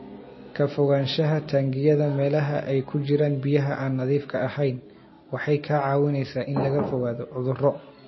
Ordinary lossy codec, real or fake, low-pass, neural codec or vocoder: MP3, 24 kbps; real; 7.2 kHz; none